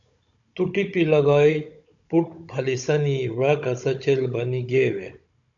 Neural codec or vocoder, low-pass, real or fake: codec, 16 kHz, 16 kbps, FunCodec, trained on Chinese and English, 50 frames a second; 7.2 kHz; fake